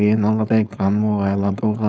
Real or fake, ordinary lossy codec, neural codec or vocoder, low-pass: fake; none; codec, 16 kHz, 4.8 kbps, FACodec; none